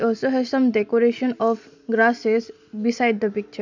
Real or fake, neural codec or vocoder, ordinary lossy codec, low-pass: real; none; none; 7.2 kHz